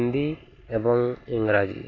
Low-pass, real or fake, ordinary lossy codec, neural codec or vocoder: 7.2 kHz; real; AAC, 32 kbps; none